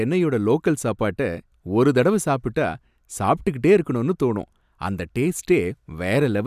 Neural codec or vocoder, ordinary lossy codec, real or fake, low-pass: none; none; real; 14.4 kHz